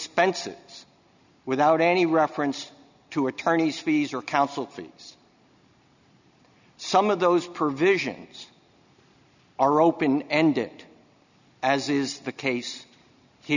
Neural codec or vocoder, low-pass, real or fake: none; 7.2 kHz; real